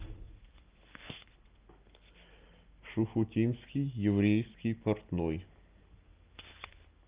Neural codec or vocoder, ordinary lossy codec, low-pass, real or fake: none; Opus, 24 kbps; 3.6 kHz; real